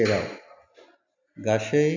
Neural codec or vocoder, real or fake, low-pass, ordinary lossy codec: none; real; 7.2 kHz; AAC, 48 kbps